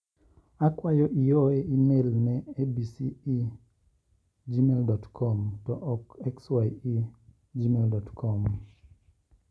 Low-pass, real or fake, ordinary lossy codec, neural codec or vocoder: none; real; none; none